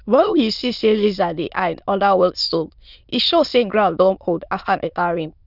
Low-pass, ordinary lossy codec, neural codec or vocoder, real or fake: 5.4 kHz; none; autoencoder, 22.05 kHz, a latent of 192 numbers a frame, VITS, trained on many speakers; fake